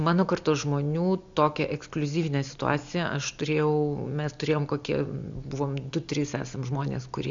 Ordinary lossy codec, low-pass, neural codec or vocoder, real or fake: MP3, 64 kbps; 7.2 kHz; none; real